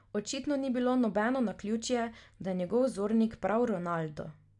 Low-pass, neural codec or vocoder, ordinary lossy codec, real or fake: 10.8 kHz; none; none; real